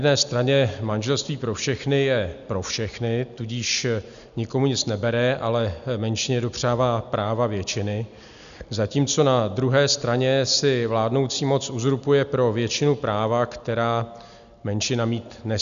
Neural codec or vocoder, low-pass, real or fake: none; 7.2 kHz; real